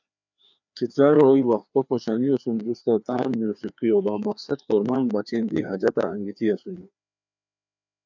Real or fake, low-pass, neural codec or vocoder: fake; 7.2 kHz; codec, 16 kHz, 2 kbps, FreqCodec, larger model